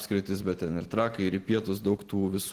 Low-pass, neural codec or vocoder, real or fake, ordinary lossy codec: 14.4 kHz; none; real; Opus, 16 kbps